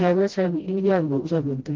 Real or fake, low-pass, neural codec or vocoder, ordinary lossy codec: fake; 7.2 kHz; codec, 16 kHz, 0.5 kbps, FreqCodec, smaller model; Opus, 16 kbps